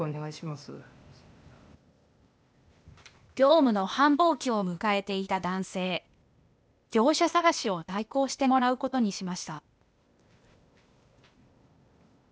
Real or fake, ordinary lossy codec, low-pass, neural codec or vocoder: fake; none; none; codec, 16 kHz, 0.8 kbps, ZipCodec